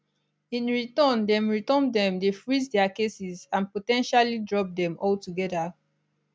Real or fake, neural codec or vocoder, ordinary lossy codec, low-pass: real; none; none; none